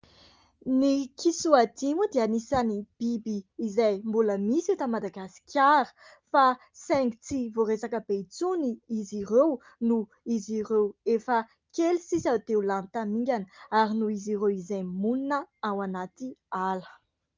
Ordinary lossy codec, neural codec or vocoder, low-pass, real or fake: Opus, 24 kbps; none; 7.2 kHz; real